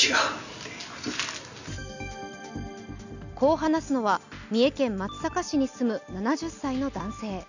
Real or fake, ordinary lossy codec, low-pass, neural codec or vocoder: real; none; 7.2 kHz; none